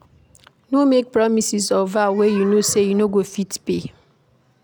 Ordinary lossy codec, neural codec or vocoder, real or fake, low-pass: none; none; real; none